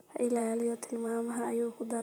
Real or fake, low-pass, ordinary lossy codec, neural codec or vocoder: fake; none; none; vocoder, 44.1 kHz, 128 mel bands, Pupu-Vocoder